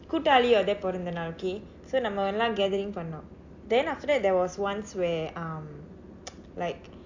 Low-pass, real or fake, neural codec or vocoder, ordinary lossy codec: 7.2 kHz; real; none; AAC, 48 kbps